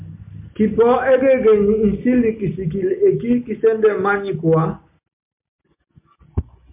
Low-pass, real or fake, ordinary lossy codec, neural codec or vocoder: 3.6 kHz; real; MP3, 32 kbps; none